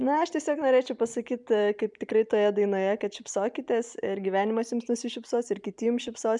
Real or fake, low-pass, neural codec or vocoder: fake; 10.8 kHz; vocoder, 44.1 kHz, 128 mel bands every 256 samples, BigVGAN v2